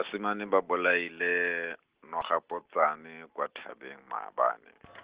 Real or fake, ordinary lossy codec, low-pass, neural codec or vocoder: real; Opus, 16 kbps; 3.6 kHz; none